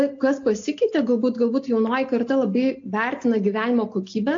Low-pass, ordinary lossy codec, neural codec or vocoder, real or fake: 7.2 kHz; AAC, 48 kbps; none; real